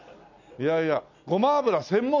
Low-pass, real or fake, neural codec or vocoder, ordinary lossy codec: 7.2 kHz; real; none; none